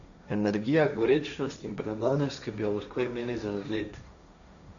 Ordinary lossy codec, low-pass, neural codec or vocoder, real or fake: none; 7.2 kHz; codec, 16 kHz, 1.1 kbps, Voila-Tokenizer; fake